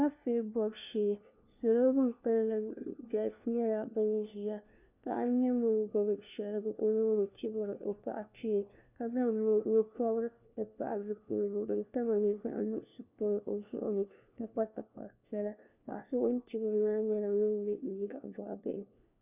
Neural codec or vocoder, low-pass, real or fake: codec, 16 kHz, 1 kbps, FunCodec, trained on LibriTTS, 50 frames a second; 3.6 kHz; fake